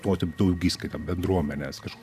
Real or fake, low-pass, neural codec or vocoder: fake; 14.4 kHz; vocoder, 44.1 kHz, 128 mel bands every 512 samples, BigVGAN v2